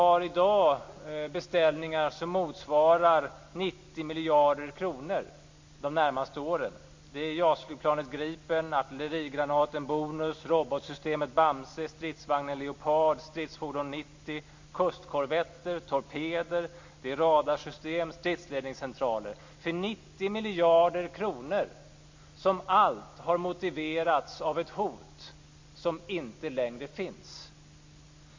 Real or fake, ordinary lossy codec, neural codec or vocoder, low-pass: real; MP3, 48 kbps; none; 7.2 kHz